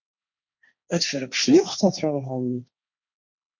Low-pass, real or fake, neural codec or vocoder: 7.2 kHz; fake; codec, 16 kHz, 1.1 kbps, Voila-Tokenizer